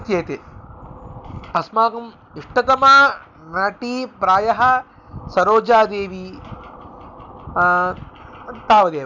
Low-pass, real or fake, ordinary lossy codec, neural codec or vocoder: 7.2 kHz; real; none; none